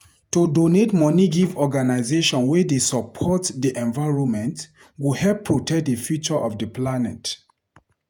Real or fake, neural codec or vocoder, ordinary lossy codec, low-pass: fake; vocoder, 48 kHz, 128 mel bands, Vocos; none; 19.8 kHz